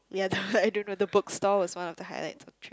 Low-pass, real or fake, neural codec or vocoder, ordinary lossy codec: none; real; none; none